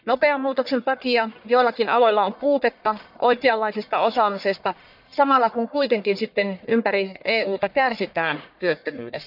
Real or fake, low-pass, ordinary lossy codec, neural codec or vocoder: fake; 5.4 kHz; none; codec, 44.1 kHz, 1.7 kbps, Pupu-Codec